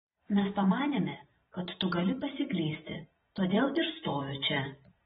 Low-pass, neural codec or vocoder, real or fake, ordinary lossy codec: 19.8 kHz; vocoder, 44.1 kHz, 128 mel bands every 512 samples, BigVGAN v2; fake; AAC, 16 kbps